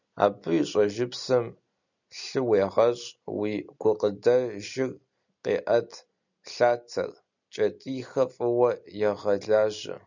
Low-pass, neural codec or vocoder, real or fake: 7.2 kHz; none; real